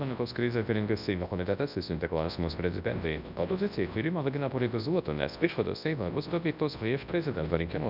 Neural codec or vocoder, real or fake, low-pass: codec, 24 kHz, 0.9 kbps, WavTokenizer, large speech release; fake; 5.4 kHz